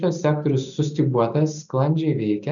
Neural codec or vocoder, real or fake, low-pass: none; real; 7.2 kHz